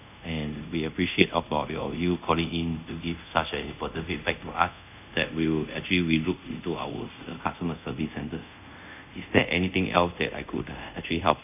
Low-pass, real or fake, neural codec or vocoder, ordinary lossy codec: 3.6 kHz; fake; codec, 24 kHz, 0.5 kbps, DualCodec; none